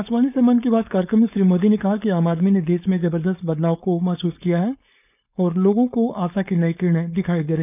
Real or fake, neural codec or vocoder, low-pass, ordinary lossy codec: fake; codec, 16 kHz, 4.8 kbps, FACodec; 3.6 kHz; none